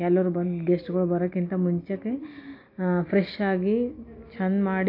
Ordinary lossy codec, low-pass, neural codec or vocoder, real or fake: AAC, 32 kbps; 5.4 kHz; none; real